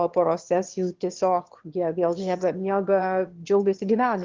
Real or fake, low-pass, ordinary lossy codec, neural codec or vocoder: fake; 7.2 kHz; Opus, 16 kbps; autoencoder, 22.05 kHz, a latent of 192 numbers a frame, VITS, trained on one speaker